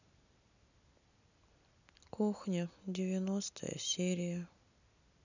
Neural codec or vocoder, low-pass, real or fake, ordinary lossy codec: none; 7.2 kHz; real; none